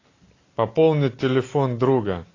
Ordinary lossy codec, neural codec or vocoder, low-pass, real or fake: AAC, 32 kbps; none; 7.2 kHz; real